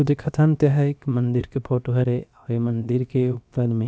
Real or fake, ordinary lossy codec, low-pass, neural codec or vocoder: fake; none; none; codec, 16 kHz, about 1 kbps, DyCAST, with the encoder's durations